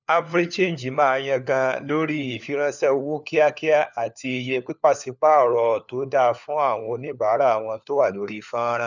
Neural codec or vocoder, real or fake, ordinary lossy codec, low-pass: codec, 16 kHz, 4 kbps, FunCodec, trained on LibriTTS, 50 frames a second; fake; none; 7.2 kHz